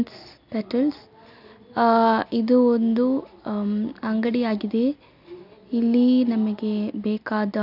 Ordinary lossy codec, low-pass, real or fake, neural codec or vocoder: none; 5.4 kHz; real; none